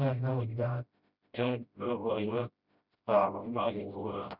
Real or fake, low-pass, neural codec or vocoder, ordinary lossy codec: fake; 5.4 kHz; codec, 16 kHz, 0.5 kbps, FreqCodec, smaller model; none